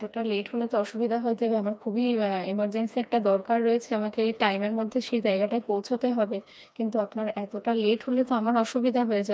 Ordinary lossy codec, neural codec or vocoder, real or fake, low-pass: none; codec, 16 kHz, 2 kbps, FreqCodec, smaller model; fake; none